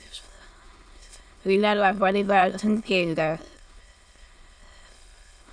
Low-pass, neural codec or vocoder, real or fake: 9.9 kHz; autoencoder, 22.05 kHz, a latent of 192 numbers a frame, VITS, trained on many speakers; fake